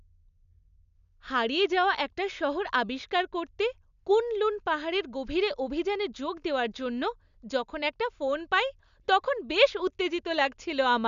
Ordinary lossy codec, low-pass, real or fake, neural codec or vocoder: none; 7.2 kHz; real; none